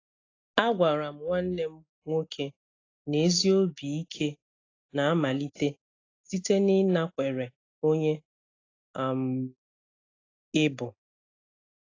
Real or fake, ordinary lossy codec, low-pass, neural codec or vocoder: real; AAC, 32 kbps; 7.2 kHz; none